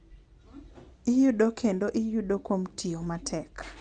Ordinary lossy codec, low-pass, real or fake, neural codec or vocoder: Opus, 32 kbps; 9.9 kHz; real; none